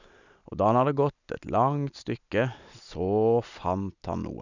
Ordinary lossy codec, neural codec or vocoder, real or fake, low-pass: none; none; real; 7.2 kHz